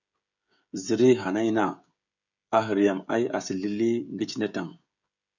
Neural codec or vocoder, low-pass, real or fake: codec, 16 kHz, 16 kbps, FreqCodec, smaller model; 7.2 kHz; fake